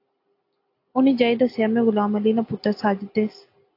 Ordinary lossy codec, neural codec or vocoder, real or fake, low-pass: AAC, 32 kbps; none; real; 5.4 kHz